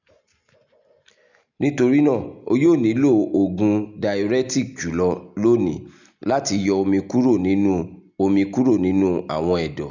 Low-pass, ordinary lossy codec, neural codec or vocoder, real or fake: 7.2 kHz; none; none; real